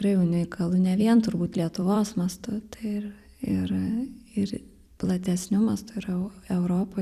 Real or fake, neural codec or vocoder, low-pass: fake; vocoder, 44.1 kHz, 128 mel bands every 256 samples, BigVGAN v2; 14.4 kHz